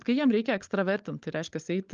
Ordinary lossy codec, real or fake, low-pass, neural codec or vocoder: Opus, 24 kbps; fake; 7.2 kHz; codec, 16 kHz, 16 kbps, FunCodec, trained on LibriTTS, 50 frames a second